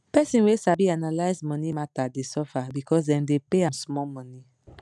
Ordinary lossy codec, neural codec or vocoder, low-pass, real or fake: none; none; none; real